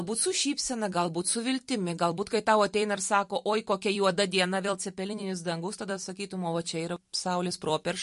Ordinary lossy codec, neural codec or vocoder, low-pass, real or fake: MP3, 48 kbps; vocoder, 44.1 kHz, 128 mel bands every 256 samples, BigVGAN v2; 14.4 kHz; fake